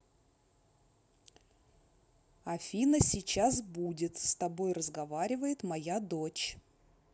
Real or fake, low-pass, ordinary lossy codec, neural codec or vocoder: real; none; none; none